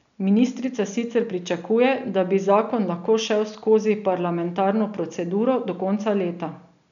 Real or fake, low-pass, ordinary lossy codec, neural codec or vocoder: real; 7.2 kHz; none; none